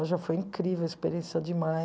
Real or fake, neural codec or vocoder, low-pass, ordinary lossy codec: real; none; none; none